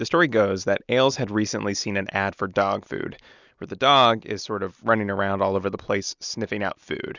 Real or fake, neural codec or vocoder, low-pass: real; none; 7.2 kHz